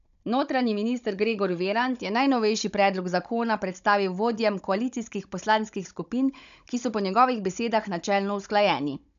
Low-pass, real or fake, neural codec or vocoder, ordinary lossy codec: 7.2 kHz; fake; codec, 16 kHz, 16 kbps, FunCodec, trained on Chinese and English, 50 frames a second; none